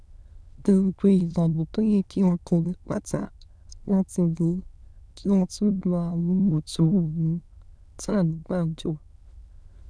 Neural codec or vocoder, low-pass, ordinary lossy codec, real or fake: autoencoder, 22.05 kHz, a latent of 192 numbers a frame, VITS, trained on many speakers; none; none; fake